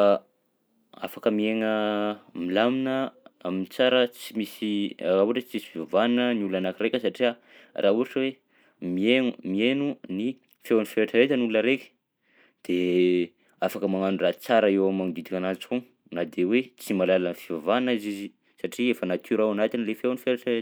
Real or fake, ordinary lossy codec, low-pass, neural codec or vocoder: real; none; none; none